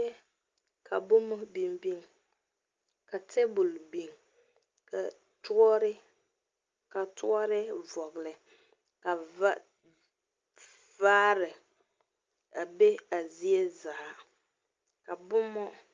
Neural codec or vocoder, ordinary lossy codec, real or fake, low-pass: none; Opus, 24 kbps; real; 7.2 kHz